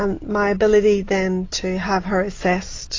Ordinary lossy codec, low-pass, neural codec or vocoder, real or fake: AAC, 32 kbps; 7.2 kHz; none; real